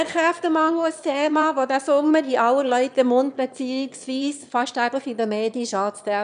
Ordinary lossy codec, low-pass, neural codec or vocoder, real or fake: none; 9.9 kHz; autoencoder, 22.05 kHz, a latent of 192 numbers a frame, VITS, trained on one speaker; fake